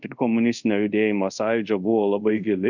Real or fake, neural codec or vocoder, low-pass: fake; codec, 24 kHz, 0.5 kbps, DualCodec; 7.2 kHz